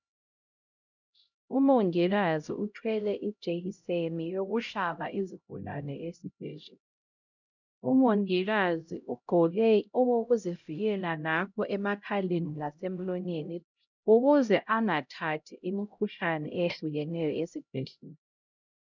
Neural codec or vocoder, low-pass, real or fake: codec, 16 kHz, 0.5 kbps, X-Codec, HuBERT features, trained on LibriSpeech; 7.2 kHz; fake